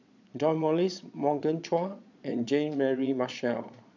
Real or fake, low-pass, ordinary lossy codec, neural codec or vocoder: fake; 7.2 kHz; none; vocoder, 22.05 kHz, 80 mel bands, Vocos